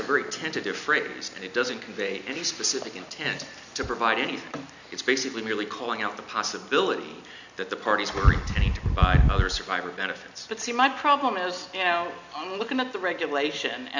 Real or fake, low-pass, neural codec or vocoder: real; 7.2 kHz; none